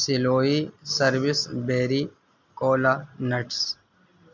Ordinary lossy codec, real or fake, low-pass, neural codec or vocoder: MP3, 64 kbps; real; 7.2 kHz; none